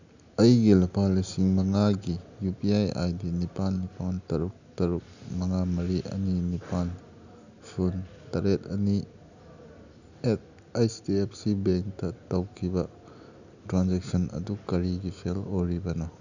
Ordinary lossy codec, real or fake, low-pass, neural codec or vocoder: none; real; 7.2 kHz; none